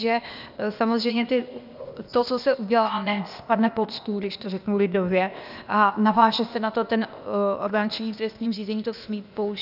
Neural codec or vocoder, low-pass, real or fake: codec, 16 kHz, 0.8 kbps, ZipCodec; 5.4 kHz; fake